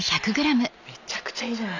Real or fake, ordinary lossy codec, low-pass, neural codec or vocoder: real; none; 7.2 kHz; none